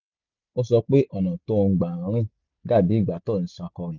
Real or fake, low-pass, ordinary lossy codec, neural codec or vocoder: real; 7.2 kHz; none; none